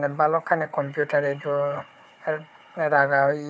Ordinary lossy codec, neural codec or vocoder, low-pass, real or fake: none; codec, 16 kHz, 4 kbps, FunCodec, trained on Chinese and English, 50 frames a second; none; fake